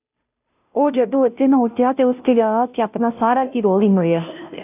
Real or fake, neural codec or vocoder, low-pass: fake; codec, 16 kHz, 0.5 kbps, FunCodec, trained on Chinese and English, 25 frames a second; 3.6 kHz